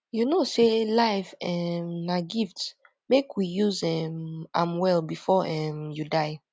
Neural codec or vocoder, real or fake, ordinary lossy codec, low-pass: none; real; none; none